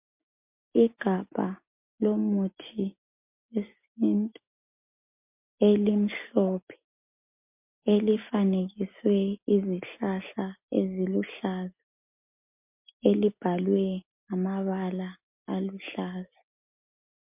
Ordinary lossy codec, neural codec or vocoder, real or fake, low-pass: MP3, 32 kbps; none; real; 3.6 kHz